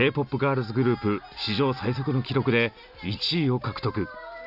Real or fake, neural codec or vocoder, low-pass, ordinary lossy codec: real; none; 5.4 kHz; none